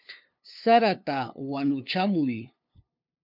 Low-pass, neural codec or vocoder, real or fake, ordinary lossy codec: 5.4 kHz; codec, 16 kHz, 2 kbps, FunCodec, trained on LibriTTS, 25 frames a second; fake; MP3, 48 kbps